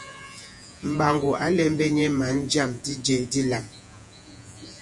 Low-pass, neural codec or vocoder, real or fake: 10.8 kHz; vocoder, 48 kHz, 128 mel bands, Vocos; fake